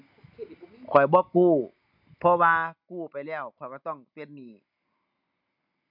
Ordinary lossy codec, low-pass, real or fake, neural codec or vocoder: none; 5.4 kHz; real; none